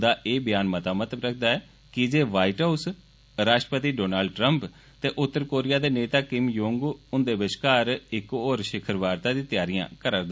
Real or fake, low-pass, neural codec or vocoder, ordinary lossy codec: real; none; none; none